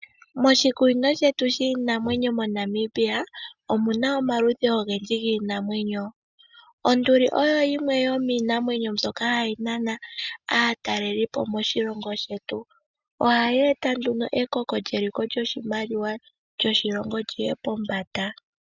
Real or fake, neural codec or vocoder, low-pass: real; none; 7.2 kHz